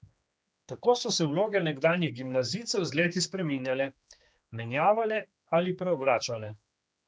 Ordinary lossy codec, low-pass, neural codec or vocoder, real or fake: none; none; codec, 16 kHz, 2 kbps, X-Codec, HuBERT features, trained on general audio; fake